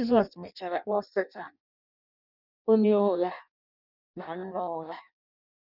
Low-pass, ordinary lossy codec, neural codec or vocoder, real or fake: 5.4 kHz; none; codec, 16 kHz in and 24 kHz out, 0.6 kbps, FireRedTTS-2 codec; fake